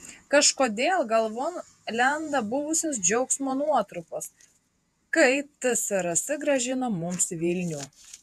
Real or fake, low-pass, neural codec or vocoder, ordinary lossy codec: fake; 14.4 kHz; vocoder, 48 kHz, 128 mel bands, Vocos; AAC, 96 kbps